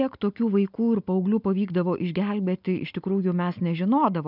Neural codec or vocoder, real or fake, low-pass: none; real; 5.4 kHz